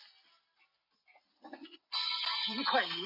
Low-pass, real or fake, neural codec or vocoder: 5.4 kHz; real; none